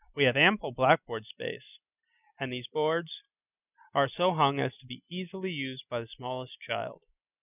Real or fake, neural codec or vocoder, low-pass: real; none; 3.6 kHz